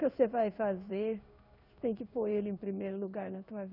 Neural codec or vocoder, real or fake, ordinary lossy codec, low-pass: codec, 16 kHz in and 24 kHz out, 1 kbps, XY-Tokenizer; fake; none; 5.4 kHz